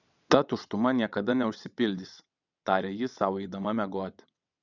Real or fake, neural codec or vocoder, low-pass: fake; vocoder, 22.05 kHz, 80 mel bands, WaveNeXt; 7.2 kHz